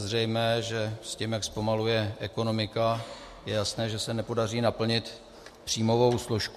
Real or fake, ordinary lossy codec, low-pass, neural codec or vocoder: real; MP3, 64 kbps; 14.4 kHz; none